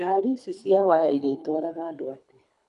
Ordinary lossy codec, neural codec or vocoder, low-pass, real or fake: none; codec, 24 kHz, 3 kbps, HILCodec; 10.8 kHz; fake